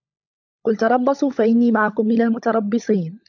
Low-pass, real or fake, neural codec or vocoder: 7.2 kHz; fake; codec, 16 kHz, 16 kbps, FunCodec, trained on LibriTTS, 50 frames a second